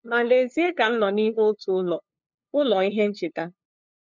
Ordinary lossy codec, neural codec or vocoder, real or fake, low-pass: MP3, 64 kbps; codec, 16 kHz, 2 kbps, FunCodec, trained on LibriTTS, 25 frames a second; fake; 7.2 kHz